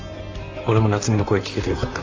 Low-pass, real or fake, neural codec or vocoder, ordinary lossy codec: 7.2 kHz; fake; vocoder, 44.1 kHz, 128 mel bands, Pupu-Vocoder; AAC, 48 kbps